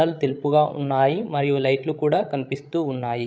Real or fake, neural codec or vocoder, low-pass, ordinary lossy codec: fake; codec, 16 kHz, 16 kbps, FreqCodec, larger model; none; none